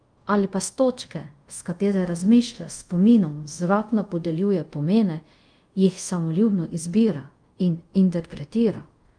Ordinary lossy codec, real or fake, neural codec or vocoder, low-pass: Opus, 32 kbps; fake; codec, 24 kHz, 0.5 kbps, DualCodec; 9.9 kHz